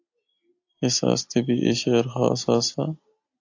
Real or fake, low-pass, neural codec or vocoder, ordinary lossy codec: real; 7.2 kHz; none; AAC, 48 kbps